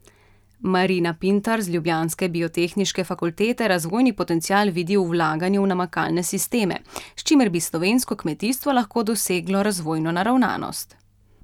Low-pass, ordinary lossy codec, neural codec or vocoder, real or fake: 19.8 kHz; none; none; real